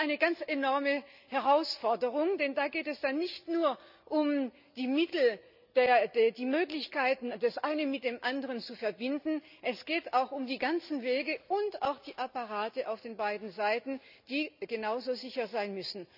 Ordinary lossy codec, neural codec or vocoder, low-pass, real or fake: none; none; 5.4 kHz; real